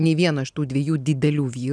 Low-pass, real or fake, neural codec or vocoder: 9.9 kHz; real; none